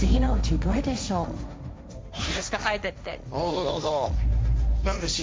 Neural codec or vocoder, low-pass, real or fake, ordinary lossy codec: codec, 16 kHz, 1.1 kbps, Voila-Tokenizer; none; fake; none